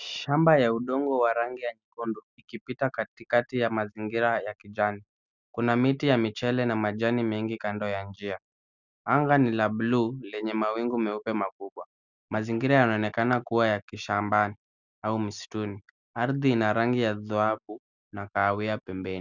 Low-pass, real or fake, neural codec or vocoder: 7.2 kHz; real; none